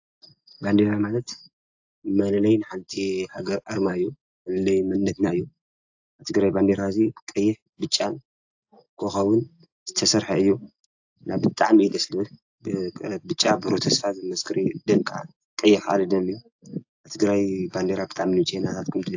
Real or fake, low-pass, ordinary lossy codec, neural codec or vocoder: real; 7.2 kHz; AAC, 48 kbps; none